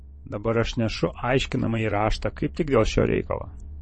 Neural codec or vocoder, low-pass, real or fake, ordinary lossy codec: vocoder, 22.05 kHz, 80 mel bands, WaveNeXt; 9.9 kHz; fake; MP3, 32 kbps